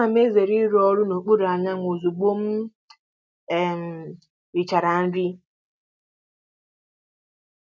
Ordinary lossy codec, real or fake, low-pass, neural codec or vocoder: none; real; none; none